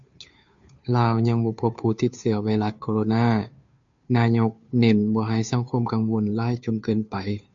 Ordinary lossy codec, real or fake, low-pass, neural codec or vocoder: MP3, 64 kbps; fake; 7.2 kHz; codec, 16 kHz, 2 kbps, FunCodec, trained on Chinese and English, 25 frames a second